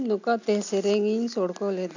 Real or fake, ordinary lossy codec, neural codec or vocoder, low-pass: real; none; none; 7.2 kHz